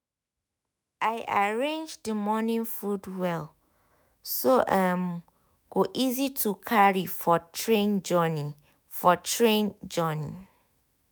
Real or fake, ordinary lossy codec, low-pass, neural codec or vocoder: fake; none; none; autoencoder, 48 kHz, 128 numbers a frame, DAC-VAE, trained on Japanese speech